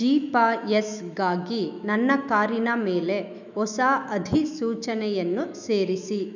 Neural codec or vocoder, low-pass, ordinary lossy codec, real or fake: autoencoder, 48 kHz, 128 numbers a frame, DAC-VAE, trained on Japanese speech; 7.2 kHz; none; fake